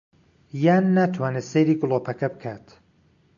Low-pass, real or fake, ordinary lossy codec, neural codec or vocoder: 7.2 kHz; real; AAC, 64 kbps; none